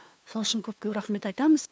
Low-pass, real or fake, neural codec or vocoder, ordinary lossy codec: none; fake; codec, 16 kHz, 2 kbps, FunCodec, trained on LibriTTS, 25 frames a second; none